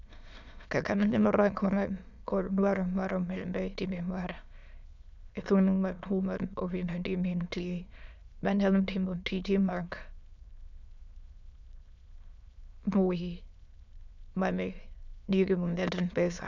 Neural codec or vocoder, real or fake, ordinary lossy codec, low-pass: autoencoder, 22.05 kHz, a latent of 192 numbers a frame, VITS, trained on many speakers; fake; none; 7.2 kHz